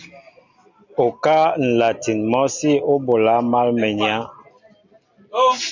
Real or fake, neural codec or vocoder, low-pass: real; none; 7.2 kHz